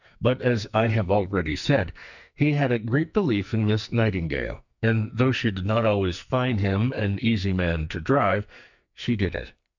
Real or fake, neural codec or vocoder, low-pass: fake; codec, 44.1 kHz, 2.6 kbps, SNAC; 7.2 kHz